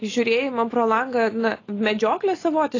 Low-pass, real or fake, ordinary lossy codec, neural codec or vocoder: 7.2 kHz; real; AAC, 32 kbps; none